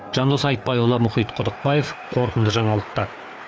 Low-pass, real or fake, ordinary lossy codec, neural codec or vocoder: none; fake; none; codec, 16 kHz, 4 kbps, FreqCodec, larger model